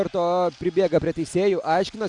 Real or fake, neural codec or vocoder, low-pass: real; none; 10.8 kHz